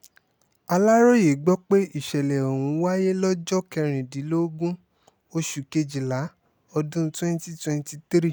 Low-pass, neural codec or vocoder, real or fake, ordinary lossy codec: none; none; real; none